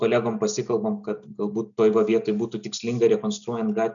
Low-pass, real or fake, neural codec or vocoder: 7.2 kHz; real; none